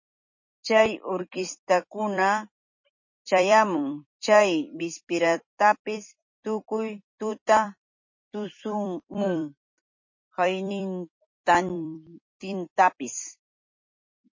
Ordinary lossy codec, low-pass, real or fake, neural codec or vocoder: MP3, 32 kbps; 7.2 kHz; fake; vocoder, 44.1 kHz, 128 mel bands every 256 samples, BigVGAN v2